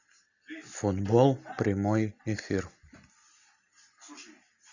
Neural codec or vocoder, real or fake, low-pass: none; real; 7.2 kHz